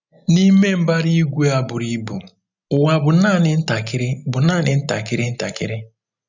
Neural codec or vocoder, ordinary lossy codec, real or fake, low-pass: none; none; real; 7.2 kHz